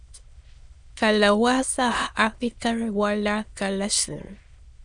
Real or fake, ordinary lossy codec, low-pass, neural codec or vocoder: fake; Opus, 64 kbps; 9.9 kHz; autoencoder, 22.05 kHz, a latent of 192 numbers a frame, VITS, trained on many speakers